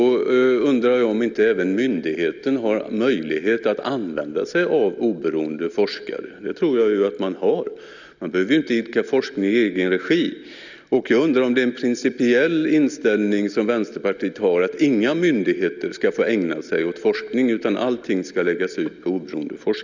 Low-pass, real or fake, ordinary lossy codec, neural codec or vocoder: 7.2 kHz; real; none; none